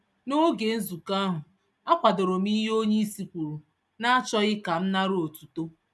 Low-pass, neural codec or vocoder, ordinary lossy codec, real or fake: none; none; none; real